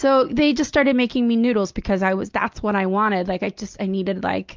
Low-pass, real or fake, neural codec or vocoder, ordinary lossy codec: 7.2 kHz; real; none; Opus, 24 kbps